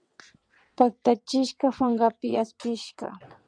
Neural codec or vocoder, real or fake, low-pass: vocoder, 22.05 kHz, 80 mel bands, WaveNeXt; fake; 9.9 kHz